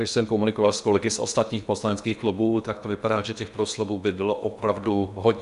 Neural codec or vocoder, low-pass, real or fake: codec, 16 kHz in and 24 kHz out, 0.8 kbps, FocalCodec, streaming, 65536 codes; 10.8 kHz; fake